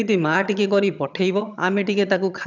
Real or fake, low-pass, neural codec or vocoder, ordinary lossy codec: fake; 7.2 kHz; vocoder, 22.05 kHz, 80 mel bands, HiFi-GAN; none